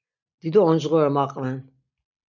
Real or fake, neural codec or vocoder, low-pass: real; none; 7.2 kHz